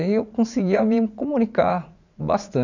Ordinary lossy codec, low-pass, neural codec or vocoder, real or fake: none; 7.2 kHz; vocoder, 44.1 kHz, 80 mel bands, Vocos; fake